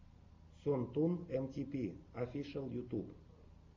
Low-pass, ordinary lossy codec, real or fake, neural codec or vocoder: 7.2 kHz; MP3, 64 kbps; real; none